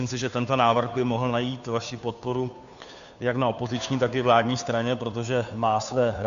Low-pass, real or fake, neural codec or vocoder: 7.2 kHz; fake; codec, 16 kHz, 2 kbps, FunCodec, trained on Chinese and English, 25 frames a second